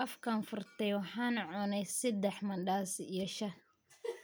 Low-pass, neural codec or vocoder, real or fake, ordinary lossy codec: none; none; real; none